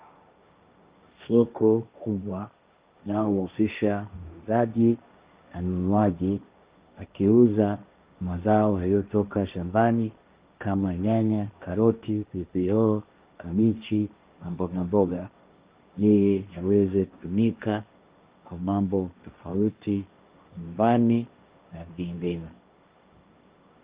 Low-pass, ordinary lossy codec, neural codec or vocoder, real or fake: 3.6 kHz; Opus, 32 kbps; codec, 16 kHz, 1.1 kbps, Voila-Tokenizer; fake